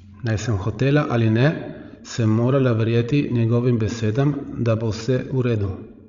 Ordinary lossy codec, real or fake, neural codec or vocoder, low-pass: Opus, 64 kbps; fake; codec, 16 kHz, 16 kbps, FreqCodec, larger model; 7.2 kHz